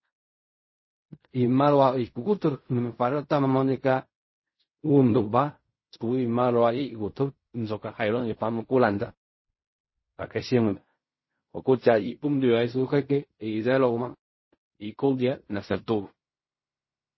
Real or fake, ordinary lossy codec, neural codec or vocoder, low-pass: fake; MP3, 24 kbps; codec, 16 kHz in and 24 kHz out, 0.4 kbps, LongCat-Audio-Codec, fine tuned four codebook decoder; 7.2 kHz